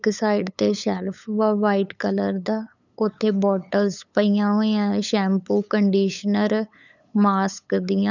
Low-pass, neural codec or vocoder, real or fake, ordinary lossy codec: 7.2 kHz; codec, 16 kHz, 8 kbps, FunCodec, trained on LibriTTS, 25 frames a second; fake; none